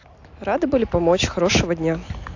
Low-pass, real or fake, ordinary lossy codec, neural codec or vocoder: 7.2 kHz; real; AAC, 48 kbps; none